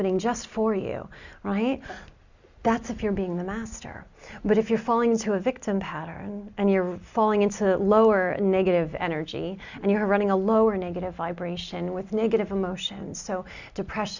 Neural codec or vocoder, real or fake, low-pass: none; real; 7.2 kHz